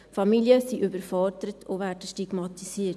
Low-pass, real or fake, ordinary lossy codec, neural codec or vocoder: none; real; none; none